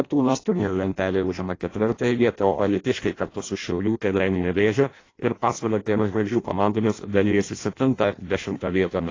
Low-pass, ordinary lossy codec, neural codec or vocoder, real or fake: 7.2 kHz; AAC, 32 kbps; codec, 16 kHz in and 24 kHz out, 0.6 kbps, FireRedTTS-2 codec; fake